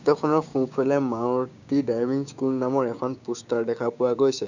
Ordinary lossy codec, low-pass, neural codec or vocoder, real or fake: none; 7.2 kHz; codec, 16 kHz, 6 kbps, DAC; fake